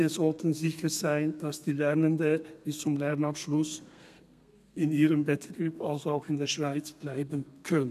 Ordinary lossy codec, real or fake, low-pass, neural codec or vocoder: none; fake; 14.4 kHz; codec, 32 kHz, 1.9 kbps, SNAC